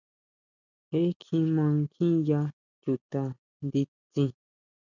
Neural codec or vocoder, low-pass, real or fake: none; 7.2 kHz; real